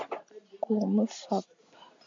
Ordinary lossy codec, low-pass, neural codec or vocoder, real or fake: AAC, 48 kbps; 7.2 kHz; none; real